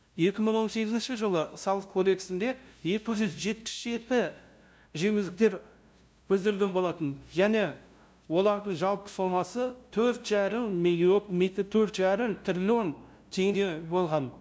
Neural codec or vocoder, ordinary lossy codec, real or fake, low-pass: codec, 16 kHz, 0.5 kbps, FunCodec, trained on LibriTTS, 25 frames a second; none; fake; none